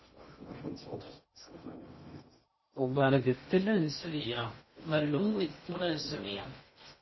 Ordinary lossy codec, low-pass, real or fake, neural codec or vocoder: MP3, 24 kbps; 7.2 kHz; fake; codec, 16 kHz in and 24 kHz out, 0.6 kbps, FocalCodec, streaming, 2048 codes